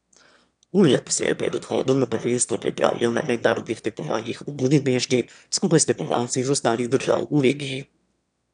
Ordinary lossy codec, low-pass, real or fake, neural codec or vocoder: none; 9.9 kHz; fake; autoencoder, 22.05 kHz, a latent of 192 numbers a frame, VITS, trained on one speaker